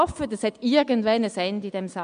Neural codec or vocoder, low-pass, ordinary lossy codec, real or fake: none; 9.9 kHz; MP3, 64 kbps; real